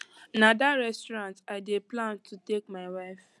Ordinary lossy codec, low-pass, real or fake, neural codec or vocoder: none; none; real; none